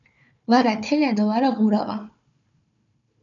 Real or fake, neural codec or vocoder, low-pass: fake; codec, 16 kHz, 4 kbps, FunCodec, trained on Chinese and English, 50 frames a second; 7.2 kHz